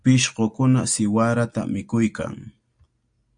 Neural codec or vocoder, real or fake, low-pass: none; real; 9.9 kHz